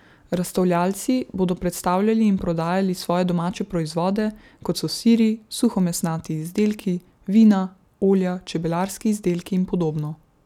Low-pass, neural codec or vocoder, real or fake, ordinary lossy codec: 19.8 kHz; none; real; none